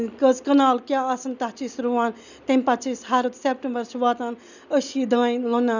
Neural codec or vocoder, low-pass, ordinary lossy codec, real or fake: none; 7.2 kHz; none; real